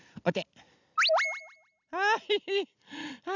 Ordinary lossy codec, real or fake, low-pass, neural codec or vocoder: none; real; 7.2 kHz; none